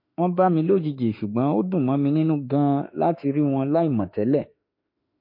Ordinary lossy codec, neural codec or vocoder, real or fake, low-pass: MP3, 32 kbps; autoencoder, 48 kHz, 32 numbers a frame, DAC-VAE, trained on Japanese speech; fake; 5.4 kHz